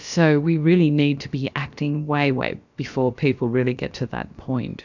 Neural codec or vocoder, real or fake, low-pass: codec, 16 kHz, about 1 kbps, DyCAST, with the encoder's durations; fake; 7.2 kHz